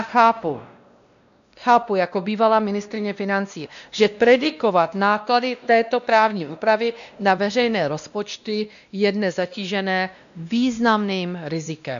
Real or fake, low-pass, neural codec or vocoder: fake; 7.2 kHz; codec, 16 kHz, 1 kbps, X-Codec, WavLM features, trained on Multilingual LibriSpeech